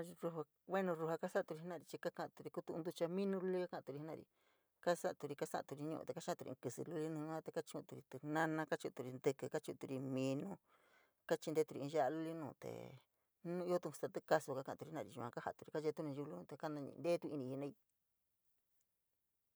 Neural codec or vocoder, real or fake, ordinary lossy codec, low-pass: none; real; none; none